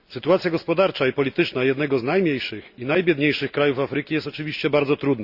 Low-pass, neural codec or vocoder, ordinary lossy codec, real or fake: 5.4 kHz; none; Opus, 64 kbps; real